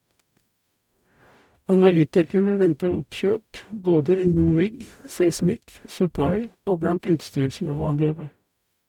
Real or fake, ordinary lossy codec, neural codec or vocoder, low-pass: fake; none; codec, 44.1 kHz, 0.9 kbps, DAC; 19.8 kHz